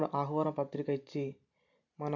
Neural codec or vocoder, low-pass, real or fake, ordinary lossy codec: none; 7.2 kHz; real; MP3, 64 kbps